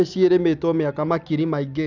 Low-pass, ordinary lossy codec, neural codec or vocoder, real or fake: 7.2 kHz; none; none; real